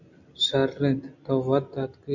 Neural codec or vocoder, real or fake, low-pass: none; real; 7.2 kHz